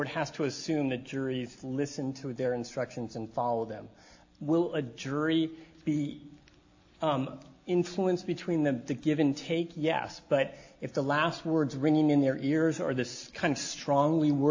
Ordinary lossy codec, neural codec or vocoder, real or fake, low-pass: AAC, 48 kbps; none; real; 7.2 kHz